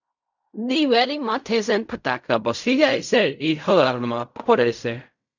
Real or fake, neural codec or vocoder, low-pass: fake; codec, 16 kHz in and 24 kHz out, 0.4 kbps, LongCat-Audio-Codec, fine tuned four codebook decoder; 7.2 kHz